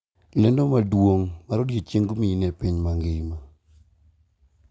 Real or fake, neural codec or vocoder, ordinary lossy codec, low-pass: real; none; none; none